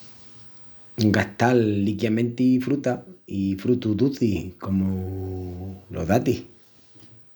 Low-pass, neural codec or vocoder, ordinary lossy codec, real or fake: none; none; none; real